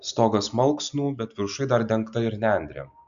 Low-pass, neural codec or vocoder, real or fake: 7.2 kHz; none; real